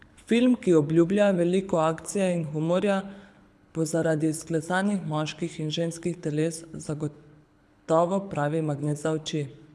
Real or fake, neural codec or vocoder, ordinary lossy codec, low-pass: fake; codec, 24 kHz, 6 kbps, HILCodec; none; none